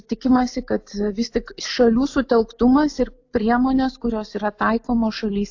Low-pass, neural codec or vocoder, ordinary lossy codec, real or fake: 7.2 kHz; none; AAC, 48 kbps; real